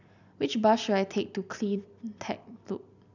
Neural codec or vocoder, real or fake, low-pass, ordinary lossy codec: none; real; 7.2 kHz; none